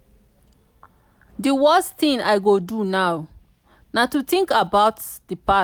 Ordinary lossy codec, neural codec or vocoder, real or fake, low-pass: none; none; real; none